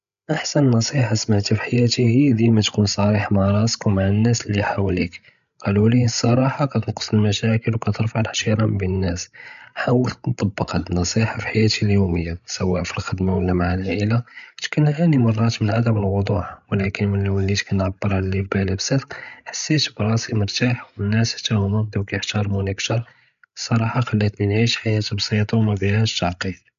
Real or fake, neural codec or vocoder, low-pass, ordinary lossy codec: fake; codec, 16 kHz, 16 kbps, FreqCodec, larger model; 7.2 kHz; none